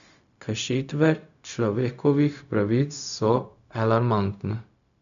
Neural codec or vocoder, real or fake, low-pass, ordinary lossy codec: codec, 16 kHz, 0.4 kbps, LongCat-Audio-Codec; fake; 7.2 kHz; none